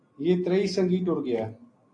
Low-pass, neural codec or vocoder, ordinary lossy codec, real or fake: 9.9 kHz; none; AAC, 48 kbps; real